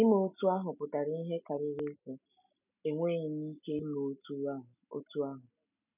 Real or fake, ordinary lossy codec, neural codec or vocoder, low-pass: real; none; none; 3.6 kHz